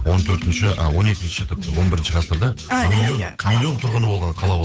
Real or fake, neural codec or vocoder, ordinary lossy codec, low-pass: fake; codec, 16 kHz, 8 kbps, FunCodec, trained on Chinese and English, 25 frames a second; none; none